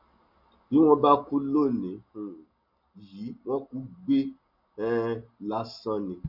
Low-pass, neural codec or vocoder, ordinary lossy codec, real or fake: 5.4 kHz; none; none; real